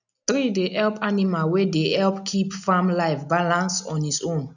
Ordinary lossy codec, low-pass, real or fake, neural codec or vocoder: none; 7.2 kHz; real; none